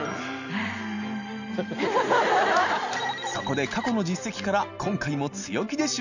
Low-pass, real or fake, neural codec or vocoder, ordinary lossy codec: 7.2 kHz; real; none; none